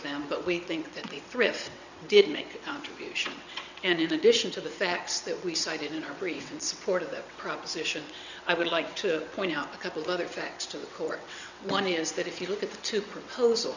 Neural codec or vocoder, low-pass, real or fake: vocoder, 22.05 kHz, 80 mel bands, WaveNeXt; 7.2 kHz; fake